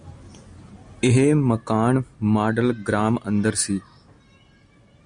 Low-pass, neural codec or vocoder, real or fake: 9.9 kHz; none; real